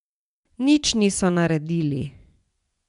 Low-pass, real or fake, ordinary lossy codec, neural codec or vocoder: 10.8 kHz; fake; none; vocoder, 24 kHz, 100 mel bands, Vocos